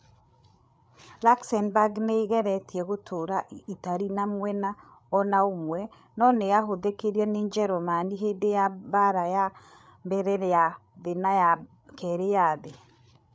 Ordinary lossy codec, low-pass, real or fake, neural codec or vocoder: none; none; fake; codec, 16 kHz, 8 kbps, FreqCodec, larger model